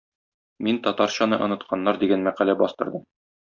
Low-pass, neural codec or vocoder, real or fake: 7.2 kHz; none; real